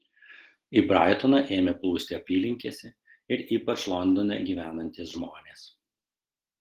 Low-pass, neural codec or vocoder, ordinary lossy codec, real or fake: 14.4 kHz; none; Opus, 16 kbps; real